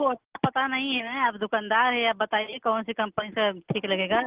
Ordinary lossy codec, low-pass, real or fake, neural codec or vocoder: Opus, 16 kbps; 3.6 kHz; real; none